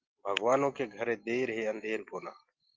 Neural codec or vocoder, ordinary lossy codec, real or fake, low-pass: none; Opus, 32 kbps; real; 7.2 kHz